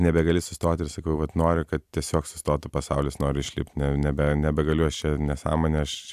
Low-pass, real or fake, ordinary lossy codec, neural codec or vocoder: 14.4 kHz; fake; AAC, 96 kbps; vocoder, 44.1 kHz, 128 mel bands every 256 samples, BigVGAN v2